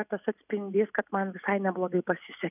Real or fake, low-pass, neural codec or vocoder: real; 3.6 kHz; none